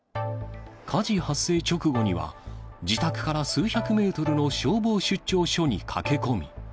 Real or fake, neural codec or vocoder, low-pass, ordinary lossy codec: real; none; none; none